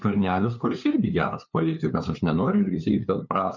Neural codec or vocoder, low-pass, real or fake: codec, 16 kHz, 2 kbps, FunCodec, trained on LibriTTS, 25 frames a second; 7.2 kHz; fake